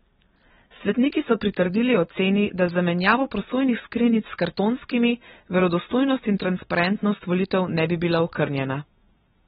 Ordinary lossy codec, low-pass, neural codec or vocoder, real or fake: AAC, 16 kbps; 10.8 kHz; none; real